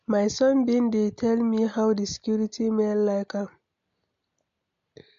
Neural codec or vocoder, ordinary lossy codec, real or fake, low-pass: none; MP3, 48 kbps; real; 7.2 kHz